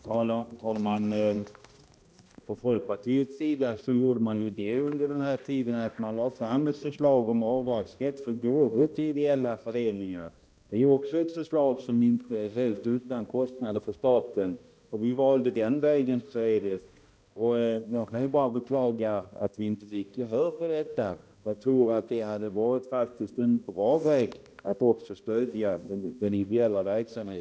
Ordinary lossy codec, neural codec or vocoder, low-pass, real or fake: none; codec, 16 kHz, 1 kbps, X-Codec, HuBERT features, trained on balanced general audio; none; fake